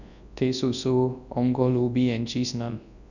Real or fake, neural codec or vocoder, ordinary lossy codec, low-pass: fake; codec, 24 kHz, 0.9 kbps, WavTokenizer, large speech release; none; 7.2 kHz